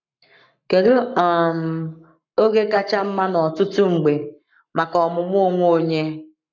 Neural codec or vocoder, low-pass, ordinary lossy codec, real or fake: codec, 44.1 kHz, 7.8 kbps, Pupu-Codec; 7.2 kHz; none; fake